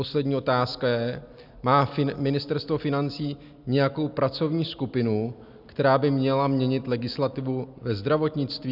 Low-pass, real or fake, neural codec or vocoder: 5.4 kHz; real; none